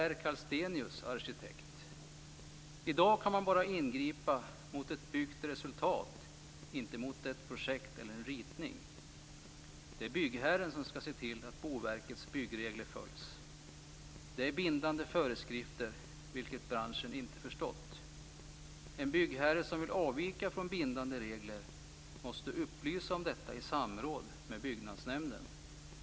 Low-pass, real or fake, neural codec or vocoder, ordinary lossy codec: none; real; none; none